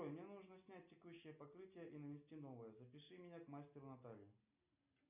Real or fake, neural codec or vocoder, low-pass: real; none; 3.6 kHz